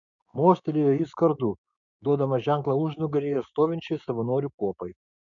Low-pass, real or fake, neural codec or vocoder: 7.2 kHz; fake; codec, 16 kHz, 6 kbps, DAC